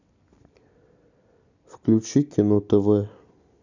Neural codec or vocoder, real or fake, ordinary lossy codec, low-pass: none; real; none; 7.2 kHz